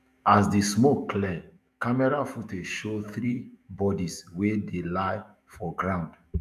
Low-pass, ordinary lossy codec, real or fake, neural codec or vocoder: 14.4 kHz; AAC, 96 kbps; fake; autoencoder, 48 kHz, 128 numbers a frame, DAC-VAE, trained on Japanese speech